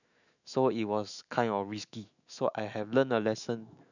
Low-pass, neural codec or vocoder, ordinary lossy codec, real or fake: 7.2 kHz; autoencoder, 48 kHz, 128 numbers a frame, DAC-VAE, trained on Japanese speech; none; fake